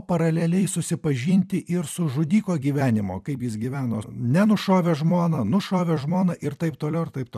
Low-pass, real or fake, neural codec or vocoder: 14.4 kHz; fake; vocoder, 44.1 kHz, 128 mel bands every 256 samples, BigVGAN v2